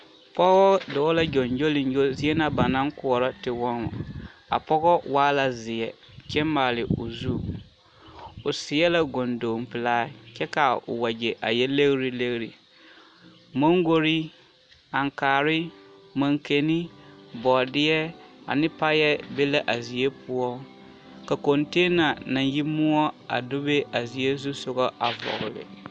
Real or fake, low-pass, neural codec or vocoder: real; 9.9 kHz; none